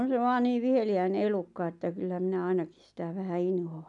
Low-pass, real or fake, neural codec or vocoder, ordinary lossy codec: 10.8 kHz; real; none; none